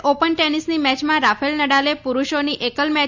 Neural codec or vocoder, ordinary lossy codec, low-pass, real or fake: none; none; 7.2 kHz; real